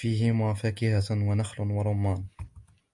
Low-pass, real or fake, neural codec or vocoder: 10.8 kHz; real; none